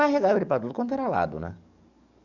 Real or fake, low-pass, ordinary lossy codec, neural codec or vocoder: fake; 7.2 kHz; none; codec, 44.1 kHz, 7.8 kbps, DAC